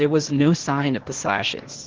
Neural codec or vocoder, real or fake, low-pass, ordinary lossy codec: codec, 16 kHz, 0.8 kbps, ZipCodec; fake; 7.2 kHz; Opus, 16 kbps